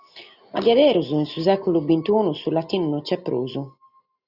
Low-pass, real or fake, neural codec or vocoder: 5.4 kHz; real; none